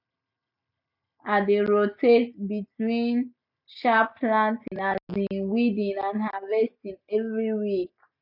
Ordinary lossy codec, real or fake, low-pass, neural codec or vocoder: none; real; 5.4 kHz; none